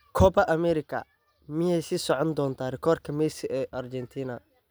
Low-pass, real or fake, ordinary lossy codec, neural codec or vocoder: none; real; none; none